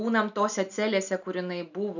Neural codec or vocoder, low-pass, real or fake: none; 7.2 kHz; real